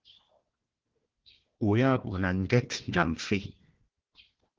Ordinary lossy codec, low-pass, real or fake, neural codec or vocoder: Opus, 16 kbps; 7.2 kHz; fake; codec, 16 kHz, 1 kbps, FunCodec, trained on Chinese and English, 50 frames a second